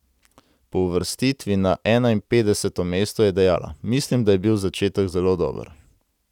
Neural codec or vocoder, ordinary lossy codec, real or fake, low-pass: none; none; real; 19.8 kHz